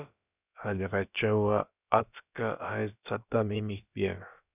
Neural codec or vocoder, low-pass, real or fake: codec, 16 kHz, about 1 kbps, DyCAST, with the encoder's durations; 3.6 kHz; fake